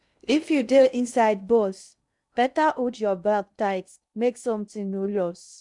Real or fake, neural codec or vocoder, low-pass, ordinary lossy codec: fake; codec, 16 kHz in and 24 kHz out, 0.6 kbps, FocalCodec, streaming, 2048 codes; 10.8 kHz; none